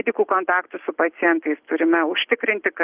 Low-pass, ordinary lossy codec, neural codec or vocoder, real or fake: 3.6 kHz; Opus, 32 kbps; none; real